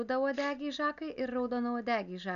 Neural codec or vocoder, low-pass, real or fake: none; 7.2 kHz; real